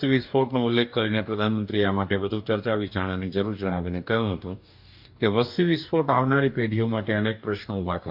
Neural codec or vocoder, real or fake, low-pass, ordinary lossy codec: codec, 44.1 kHz, 2.6 kbps, DAC; fake; 5.4 kHz; MP3, 48 kbps